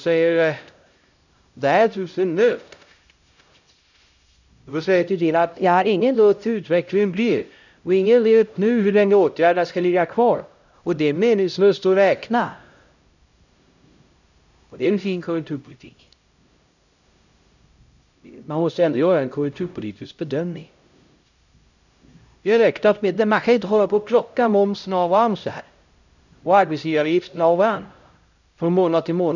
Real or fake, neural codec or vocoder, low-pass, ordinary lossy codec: fake; codec, 16 kHz, 0.5 kbps, X-Codec, HuBERT features, trained on LibriSpeech; 7.2 kHz; none